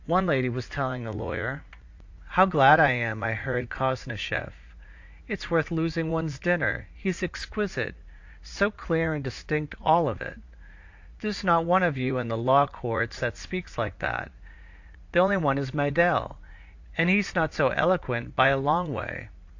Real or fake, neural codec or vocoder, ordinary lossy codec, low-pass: fake; vocoder, 44.1 kHz, 80 mel bands, Vocos; AAC, 48 kbps; 7.2 kHz